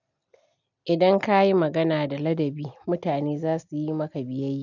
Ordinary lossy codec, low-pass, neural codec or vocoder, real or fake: none; 7.2 kHz; none; real